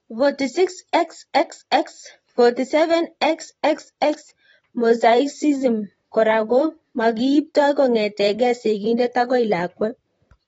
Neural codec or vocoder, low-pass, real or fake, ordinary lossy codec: vocoder, 44.1 kHz, 128 mel bands, Pupu-Vocoder; 19.8 kHz; fake; AAC, 24 kbps